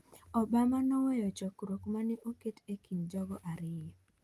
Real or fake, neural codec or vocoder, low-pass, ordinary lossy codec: real; none; 14.4 kHz; Opus, 32 kbps